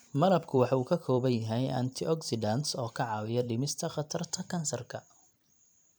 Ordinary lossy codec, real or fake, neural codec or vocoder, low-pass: none; fake; vocoder, 44.1 kHz, 128 mel bands every 256 samples, BigVGAN v2; none